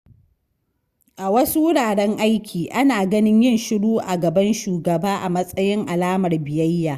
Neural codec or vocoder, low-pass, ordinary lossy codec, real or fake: none; 14.4 kHz; Opus, 64 kbps; real